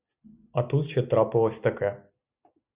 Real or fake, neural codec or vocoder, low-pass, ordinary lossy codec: real; none; 3.6 kHz; Opus, 64 kbps